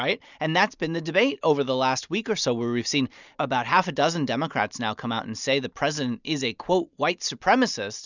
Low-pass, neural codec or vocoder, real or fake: 7.2 kHz; none; real